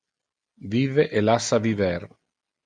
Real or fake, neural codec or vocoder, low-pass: real; none; 9.9 kHz